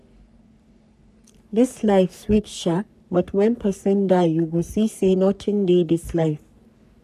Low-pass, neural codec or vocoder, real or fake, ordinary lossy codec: 14.4 kHz; codec, 44.1 kHz, 3.4 kbps, Pupu-Codec; fake; none